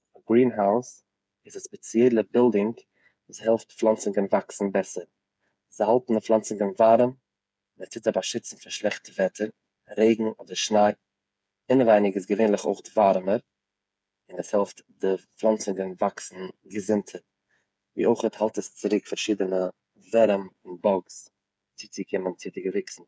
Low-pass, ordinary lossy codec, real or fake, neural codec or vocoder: none; none; fake; codec, 16 kHz, 8 kbps, FreqCodec, smaller model